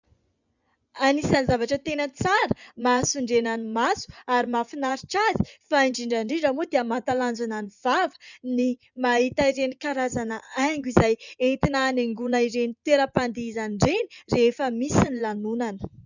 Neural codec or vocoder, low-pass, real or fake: vocoder, 24 kHz, 100 mel bands, Vocos; 7.2 kHz; fake